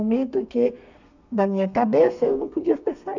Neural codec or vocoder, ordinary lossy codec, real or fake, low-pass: codec, 32 kHz, 1.9 kbps, SNAC; none; fake; 7.2 kHz